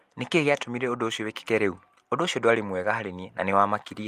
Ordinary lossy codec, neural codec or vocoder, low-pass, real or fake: Opus, 32 kbps; none; 14.4 kHz; real